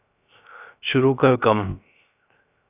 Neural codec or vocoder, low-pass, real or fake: codec, 16 kHz, 0.3 kbps, FocalCodec; 3.6 kHz; fake